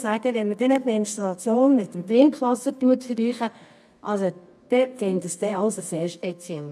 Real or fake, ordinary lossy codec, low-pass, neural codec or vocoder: fake; none; none; codec, 24 kHz, 0.9 kbps, WavTokenizer, medium music audio release